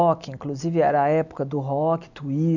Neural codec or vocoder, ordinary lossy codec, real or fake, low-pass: none; none; real; 7.2 kHz